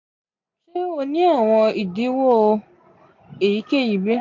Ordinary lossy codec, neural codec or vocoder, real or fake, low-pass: AAC, 48 kbps; none; real; 7.2 kHz